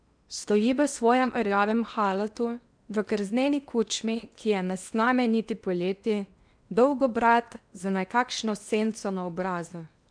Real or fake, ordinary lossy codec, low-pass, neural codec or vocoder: fake; none; 9.9 kHz; codec, 16 kHz in and 24 kHz out, 0.8 kbps, FocalCodec, streaming, 65536 codes